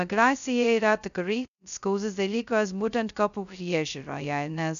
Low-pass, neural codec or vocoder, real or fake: 7.2 kHz; codec, 16 kHz, 0.2 kbps, FocalCodec; fake